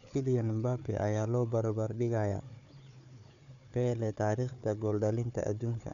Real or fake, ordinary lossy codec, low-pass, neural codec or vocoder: fake; none; 7.2 kHz; codec, 16 kHz, 4 kbps, FunCodec, trained on Chinese and English, 50 frames a second